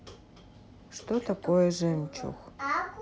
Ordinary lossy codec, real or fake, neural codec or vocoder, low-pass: none; real; none; none